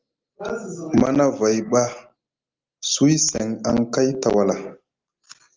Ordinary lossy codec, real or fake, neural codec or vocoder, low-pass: Opus, 24 kbps; real; none; 7.2 kHz